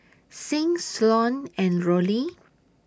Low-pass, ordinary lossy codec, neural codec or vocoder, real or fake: none; none; none; real